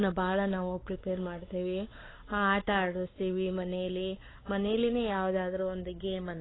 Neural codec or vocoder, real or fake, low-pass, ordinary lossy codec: codec, 16 kHz, 4 kbps, X-Codec, WavLM features, trained on Multilingual LibriSpeech; fake; 7.2 kHz; AAC, 16 kbps